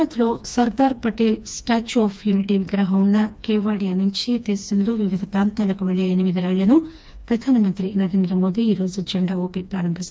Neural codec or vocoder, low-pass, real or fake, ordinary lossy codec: codec, 16 kHz, 2 kbps, FreqCodec, smaller model; none; fake; none